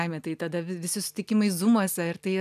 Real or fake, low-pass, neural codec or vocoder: real; 14.4 kHz; none